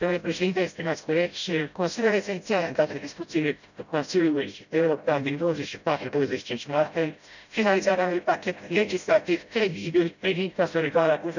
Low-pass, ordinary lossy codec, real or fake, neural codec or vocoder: 7.2 kHz; none; fake; codec, 16 kHz, 0.5 kbps, FreqCodec, smaller model